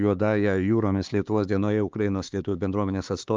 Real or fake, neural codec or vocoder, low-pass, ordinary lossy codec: fake; codec, 16 kHz, 4 kbps, X-Codec, HuBERT features, trained on LibriSpeech; 7.2 kHz; Opus, 24 kbps